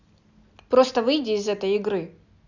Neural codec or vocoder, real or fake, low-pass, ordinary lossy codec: none; real; 7.2 kHz; none